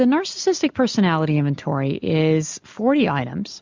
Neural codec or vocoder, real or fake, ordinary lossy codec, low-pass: none; real; MP3, 64 kbps; 7.2 kHz